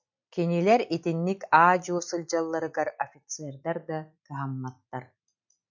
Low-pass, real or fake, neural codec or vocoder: 7.2 kHz; real; none